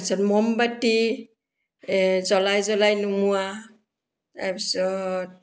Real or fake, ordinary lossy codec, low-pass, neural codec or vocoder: real; none; none; none